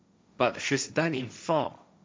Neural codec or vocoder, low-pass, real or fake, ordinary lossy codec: codec, 16 kHz, 1.1 kbps, Voila-Tokenizer; none; fake; none